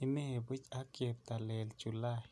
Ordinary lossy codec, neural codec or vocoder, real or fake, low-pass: none; none; real; none